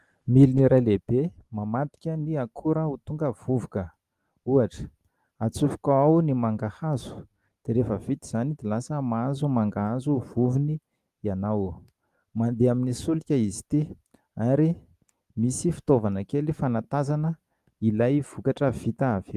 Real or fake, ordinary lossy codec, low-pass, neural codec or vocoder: real; Opus, 24 kbps; 14.4 kHz; none